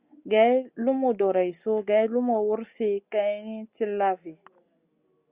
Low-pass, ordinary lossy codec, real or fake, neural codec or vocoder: 3.6 kHz; Opus, 64 kbps; real; none